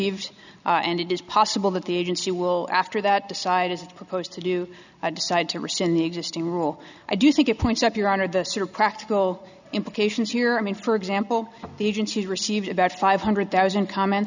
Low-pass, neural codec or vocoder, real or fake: 7.2 kHz; none; real